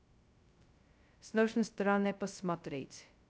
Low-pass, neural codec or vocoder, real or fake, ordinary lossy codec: none; codec, 16 kHz, 0.2 kbps, FocalCodec; fake; none